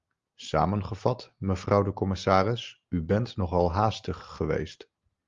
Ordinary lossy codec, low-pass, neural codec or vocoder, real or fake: Opus, 32 kbps; 7.2 kHz; none; real